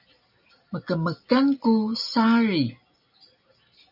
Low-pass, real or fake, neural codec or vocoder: 5.4 kHz; real; none